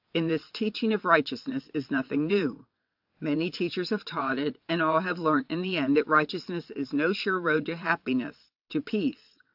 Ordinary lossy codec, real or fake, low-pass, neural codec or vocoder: AAC, 48 kbps; fake; 5.4 kHz; vocoder, 22.05 kHz, 80 mel bands, WaveNeXt